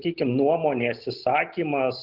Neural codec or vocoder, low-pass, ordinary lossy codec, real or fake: none; 5.4 kHz; Opus, 24 kbps; real